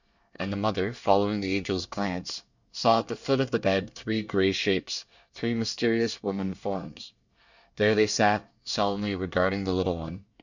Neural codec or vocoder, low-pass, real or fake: codec, 24 kHz, 1 kbps, SNAC; 7.2 kHz; fake